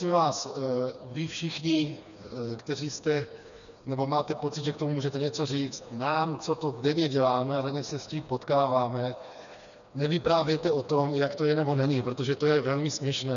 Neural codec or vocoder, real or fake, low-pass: codec, 16 kHz, 2 kbps, FreqCodec, smaller model; fake; 7.2 kHz